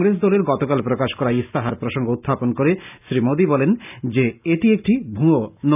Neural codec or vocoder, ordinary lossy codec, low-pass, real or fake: none; none; 3.6 kHz; real